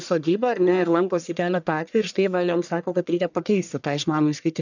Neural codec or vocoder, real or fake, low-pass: codec, 44.1 kHz, 1.7 kbps, Pupu-Codec; fake; 7.2 kHz